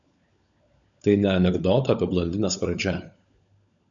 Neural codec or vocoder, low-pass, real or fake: codec, 16 kHz, 16 kbps, FunCodec, trained on LibriTTS, 50 frames a second; 7.2 kHz; fake